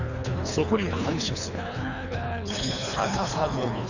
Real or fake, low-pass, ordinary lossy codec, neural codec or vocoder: fake; 7.2 kHz; none; codec, 24 kHz, 3 kbps, HILCodec